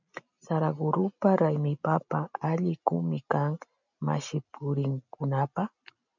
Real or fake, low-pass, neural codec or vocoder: real; 7.2 kHz; none